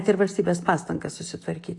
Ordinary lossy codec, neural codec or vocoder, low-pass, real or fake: AAC, 48 kbps; none; 10.8 kHz; real